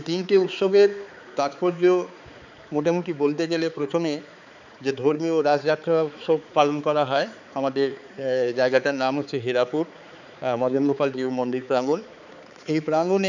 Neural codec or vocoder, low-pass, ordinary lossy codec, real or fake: codec, 16 kHz, 4 kbps, X-Codec, HuBERT features, trained on balanced general audio; 7.2 kHz; none; fake